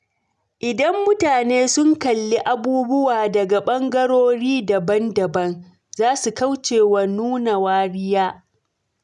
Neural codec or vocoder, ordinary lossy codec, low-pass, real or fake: none; none; none; real